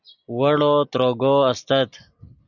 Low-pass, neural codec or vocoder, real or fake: 7.2 kHz; none; real